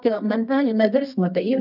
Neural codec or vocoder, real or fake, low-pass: codec, 24 kHz, 0.9 kbps, WavTokenizer, medium music audio release; fake; 5.4 kHz